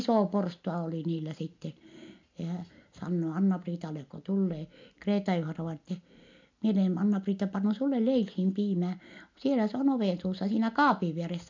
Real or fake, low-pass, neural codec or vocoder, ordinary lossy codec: real; 7.2 kHz; none; MP3, 64 kbps